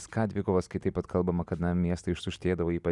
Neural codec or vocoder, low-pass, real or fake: none; 10.8 kHz; real